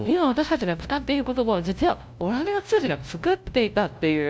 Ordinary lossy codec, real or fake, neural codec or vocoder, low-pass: none; fake; codec, 16 kHz, 0.5 kbps, FunCodec, trained on LibriTTS, 25 frames a second; none